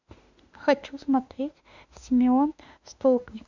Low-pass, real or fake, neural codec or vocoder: 7.2 kHz; fake; autoencoder, 48 kHz, 32 numbers a frame, DAC-VAE, trained on Japanese speech